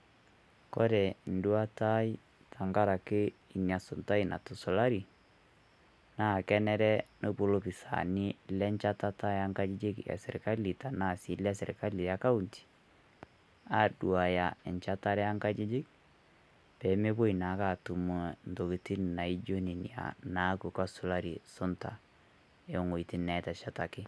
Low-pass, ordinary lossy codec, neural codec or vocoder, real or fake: none; none; none; real